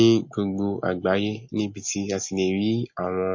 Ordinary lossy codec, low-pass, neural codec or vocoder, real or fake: MP3, 32 kbps; 7.2 kHz; none; real